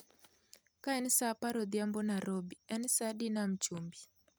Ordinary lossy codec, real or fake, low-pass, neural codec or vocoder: none; real; none; none